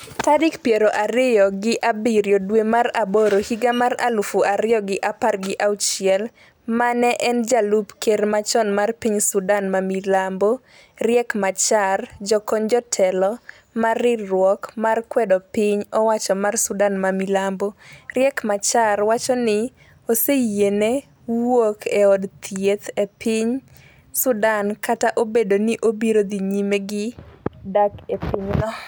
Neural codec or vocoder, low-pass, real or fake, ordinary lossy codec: none; none; real; none